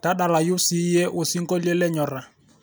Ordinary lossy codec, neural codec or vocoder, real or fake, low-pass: none; none; real; none